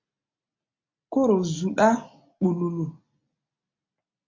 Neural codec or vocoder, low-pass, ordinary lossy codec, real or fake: none; 7.2 kHz; MP3, 48 kbps; real